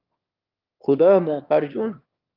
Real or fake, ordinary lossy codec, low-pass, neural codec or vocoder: fake; Opus, 24 kbps; 5.4 kHz; autoencoder, 22.05 kHz, a latent of 192 numbers a frame, VITS, trained on one speaker